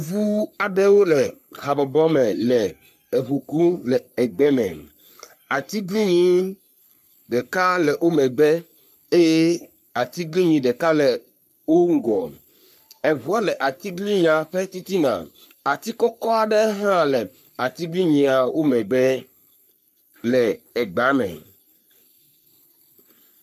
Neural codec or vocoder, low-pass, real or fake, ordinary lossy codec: codec, 44.1 kHz, 3.4 kbps, Pupu-Codec; 14.4 kHz; fake; MP3, 96 kbps